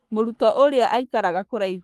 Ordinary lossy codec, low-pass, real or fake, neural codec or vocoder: Opus, 24 kbps; 14.4 kHz; fake; codec, 44.1 kHz, 3.4 kbps, Pupu-Codec